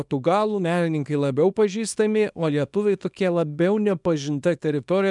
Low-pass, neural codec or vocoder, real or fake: 10.8 kHz; codec, 24 kHz, 0.9 kbps, WavTokenizer, small release; fake